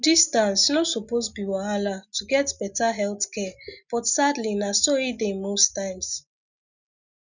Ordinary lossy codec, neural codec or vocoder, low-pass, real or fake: none; none; 7.2 kHz; real